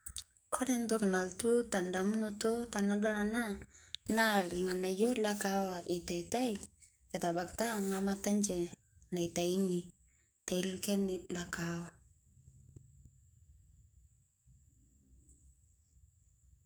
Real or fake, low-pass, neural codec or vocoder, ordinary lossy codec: fake; none; codec, 44.1 kHz, 2.6 kbps, SNAC; none